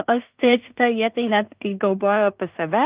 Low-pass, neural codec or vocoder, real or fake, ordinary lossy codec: 3.6 kHz; codec, 16 kHz in and 24 kHz out, 0.4 kbps, LongCat-Audio-Codec, two codebook decoder; fake; Opus, 32 kbps